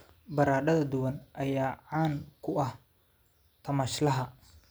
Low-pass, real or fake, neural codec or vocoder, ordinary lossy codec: none; real; none; none